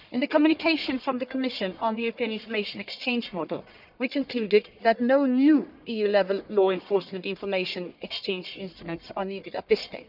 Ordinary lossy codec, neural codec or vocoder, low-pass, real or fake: none; codec, 44.1 kHz, 1.7 kbps, Pupu-Codec; 5.4 kHz; fake